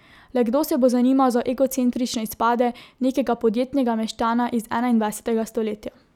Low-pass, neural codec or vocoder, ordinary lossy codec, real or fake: 19.8 kHz; none; none; real